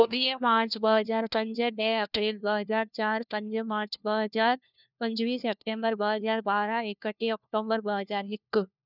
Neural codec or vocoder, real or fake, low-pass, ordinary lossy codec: codec, 16 kHz, 1 kbps, FunCodec, trained on LibriTTS, 50 frames a second; fake; 5.4 kHz; none